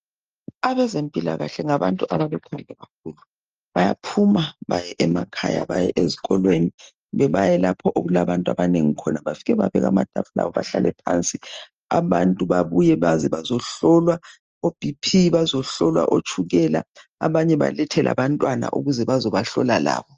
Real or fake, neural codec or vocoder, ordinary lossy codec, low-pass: real; none; Opus, 24 kbps; 7.2 kHz